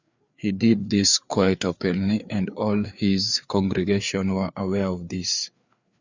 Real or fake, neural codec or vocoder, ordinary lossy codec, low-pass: fake; codec, 16 kHz, 4 kbps, FreqCodec, larger model; Opus, 64 kbps; 7.2 kHz